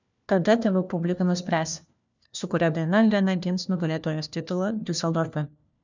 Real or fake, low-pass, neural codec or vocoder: fake; 7.2 kHz; codec, 16 kHz, 1 kbps, FunCodec, trained on LibriTTS, 50 frames a second